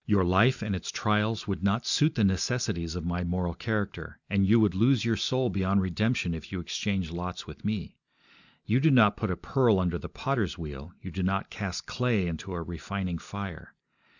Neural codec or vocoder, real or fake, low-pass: none; real; 7.2 kHz